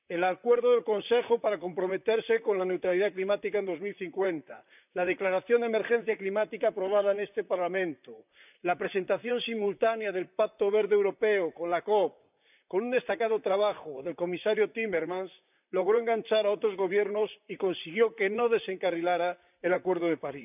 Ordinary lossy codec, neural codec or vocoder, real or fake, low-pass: none; vocoder, 44.1 kHz, 128 mel bands, Pupu-Vocoder; fake; 3.6 kHz